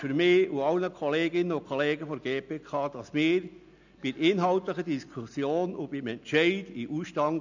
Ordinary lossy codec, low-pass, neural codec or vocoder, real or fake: none; 7.2 kHz; none; real